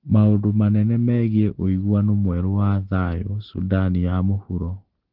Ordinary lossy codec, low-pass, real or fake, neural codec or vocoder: Opus, 16 kbps; 5.4 kHz; real; none